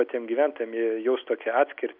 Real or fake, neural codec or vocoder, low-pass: real; none; 5.4 kHz